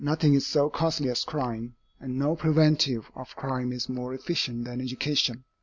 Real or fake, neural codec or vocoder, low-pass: real; none; 7.2 kHz